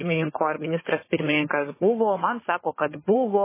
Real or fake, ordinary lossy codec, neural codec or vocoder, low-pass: fake; MP3, 16 kbps; codec, 16 kHz, 4 kbps, FunCodec, trained on Chinese and English, 50 frames a second; 3.6 kHz